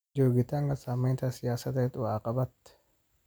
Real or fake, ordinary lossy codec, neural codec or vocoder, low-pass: real; none; none; none